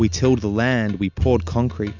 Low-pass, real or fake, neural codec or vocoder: 7.2 kHz; real; none